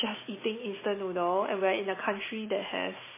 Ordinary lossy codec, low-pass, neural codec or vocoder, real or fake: MP3, 16 kbps; 3.6 kHz; none; real